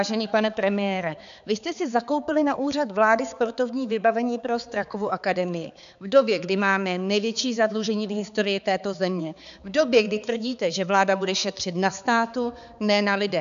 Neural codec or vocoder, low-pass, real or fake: codec, 16 kHz, 4 kbps, X-Codec, HuBERT features, trained on balanced general audio; 7.2 kHz; fake